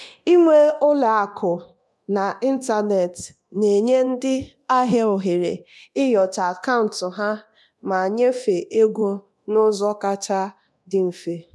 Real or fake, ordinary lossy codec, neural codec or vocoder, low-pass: fake; none; codec, 24 kHz, 0.9 kbps, DualCodec; none